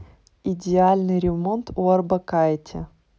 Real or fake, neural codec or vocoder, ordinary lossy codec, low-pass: real; none; none; none